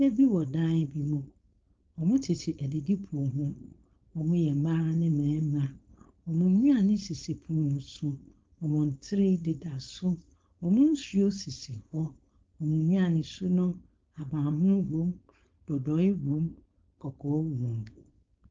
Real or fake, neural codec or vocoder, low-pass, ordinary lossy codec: fake; codec, 16 kHz, 4.8 kbps, FACodec; 7.2 kHz; Opus, 24 kbps